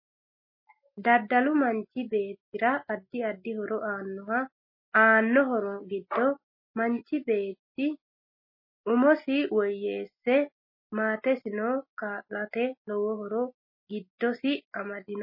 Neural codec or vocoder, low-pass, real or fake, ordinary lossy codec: none; 5.4 kHz; real; MP3, 24 kbps